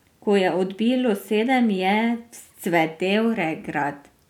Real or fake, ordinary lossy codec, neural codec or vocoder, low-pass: real; none; none; 19.8 kHz